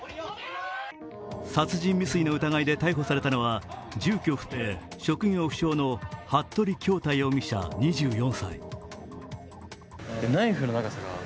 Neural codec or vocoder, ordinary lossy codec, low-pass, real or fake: none; none; none; real